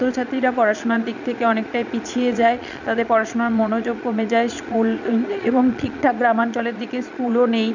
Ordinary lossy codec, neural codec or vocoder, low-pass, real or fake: none; vocoder, 22.05 kHz, 80 mel bands, Vocos; 7.2 kHz; fake